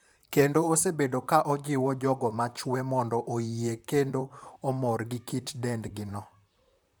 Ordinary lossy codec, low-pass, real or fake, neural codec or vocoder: none; none; fake; vocoder, 44.1 kHz, 128 mel bands, Pupu-Vocoder